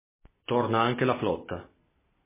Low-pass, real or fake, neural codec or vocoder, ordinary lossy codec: 3.6 kHz; real; none; MP3, 16 kbps